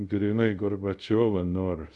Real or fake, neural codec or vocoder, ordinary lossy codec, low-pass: fake; codec, 24 kHz, 0.5 kbps, DualCodec; Opus, 64 kbps; 10.8 kHz